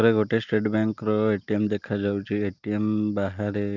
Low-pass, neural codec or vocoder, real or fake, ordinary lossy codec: 7.2 kHz; none; real; Opus, 24 kbps